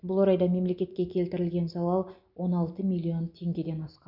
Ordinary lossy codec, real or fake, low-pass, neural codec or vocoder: none; real; 5.4 kHz; none